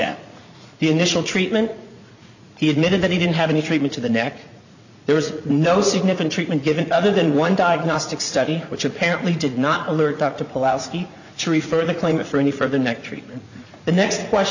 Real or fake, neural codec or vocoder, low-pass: fake; vocoder, 44.1 kHz, 80 mel bands, Vocos; 7.2 kHz